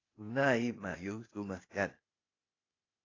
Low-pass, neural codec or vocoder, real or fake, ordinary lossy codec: 7.2 kHz; codec, 16 kHz, 0.8 kbps, ZipCodec; fake; AAC, 32 kbps